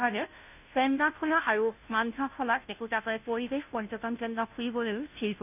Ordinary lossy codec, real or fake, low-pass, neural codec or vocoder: none; fake; 3.6 kHz; codec, 16 kHz, 0.5 kbps, FunCodec, trained on Chinese and English, 25 frames a second